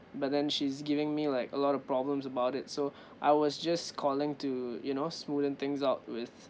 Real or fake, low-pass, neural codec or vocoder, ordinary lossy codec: real; none; none; none